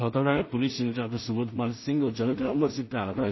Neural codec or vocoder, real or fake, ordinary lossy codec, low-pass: codec, 16 kHz in and 24 kHz out, 0.4 kbps, LongCat-Audio-Codec, two codebook decoder; fake; MP3, 24 kbps; 7.2 kHz